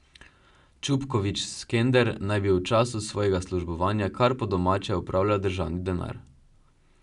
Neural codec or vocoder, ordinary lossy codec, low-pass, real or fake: none; none; 10.8 kHz; real